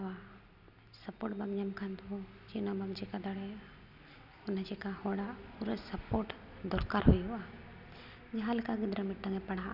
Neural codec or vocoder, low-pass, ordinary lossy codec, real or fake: none; 5.4 kHz; none; real